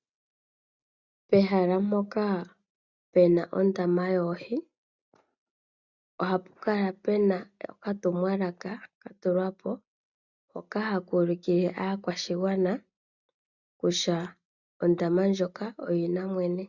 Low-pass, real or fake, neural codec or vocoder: 7.2 kHz; real; none